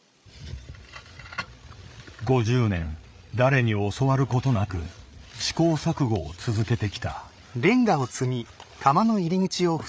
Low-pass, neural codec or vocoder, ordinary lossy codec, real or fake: none; codec, 16 kHz, 8 kbps, FreqCodec, larger model; none; fake